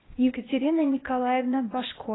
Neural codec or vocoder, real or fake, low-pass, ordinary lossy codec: codec, 16 kHz, 0.8 kbps, ZipCodec; fake; 7.2 kHz; AAC, 16 kbps